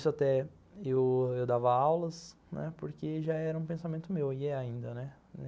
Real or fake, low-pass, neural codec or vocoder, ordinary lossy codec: real; none; none; none